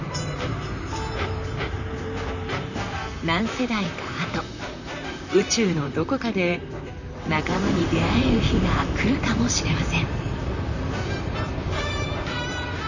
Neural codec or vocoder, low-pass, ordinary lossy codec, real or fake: vocoder, 44.1 kHz, 128 mel bands, Pupu-Vocoder; 7.2 kHz; none; fake